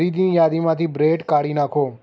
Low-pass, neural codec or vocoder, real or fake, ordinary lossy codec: none; none; real; none